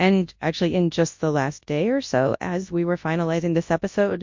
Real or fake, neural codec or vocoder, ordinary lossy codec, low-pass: fake; codec, 24 kHz, 0.9 kbps, WavTokenizer, large speech release; MP3, 48 kbps; 7.2 kHz